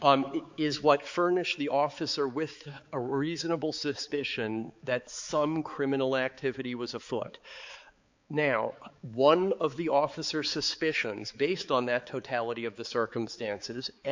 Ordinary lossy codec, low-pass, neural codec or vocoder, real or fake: MP3, 64 kbps; 7.2 kHz; codec, 16 kHz, 4 kbps, X-Codec, HuBERT features, trained on balanced general audio; fake